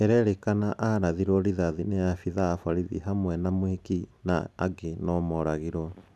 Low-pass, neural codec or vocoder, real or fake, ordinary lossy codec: none; none; real; none